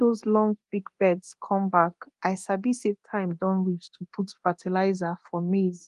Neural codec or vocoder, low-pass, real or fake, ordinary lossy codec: codec, 24 kHz, 0.9 kbps, DualCodec; 10.8 kHz; fake; Opus, 24 kbps